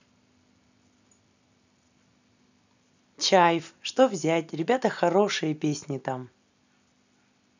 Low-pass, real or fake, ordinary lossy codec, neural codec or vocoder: 7.2 kHz; real; none; none